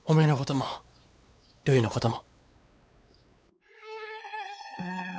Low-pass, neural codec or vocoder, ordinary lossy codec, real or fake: none; codec, 16 kHz, 4 kbps, X-Codec, WavLM features, trained on Multilingual LibriSpeech; none; fake